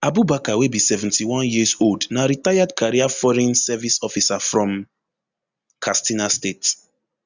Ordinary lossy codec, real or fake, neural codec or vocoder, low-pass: Opus, 64 kbps; real; none; 7.2 kHz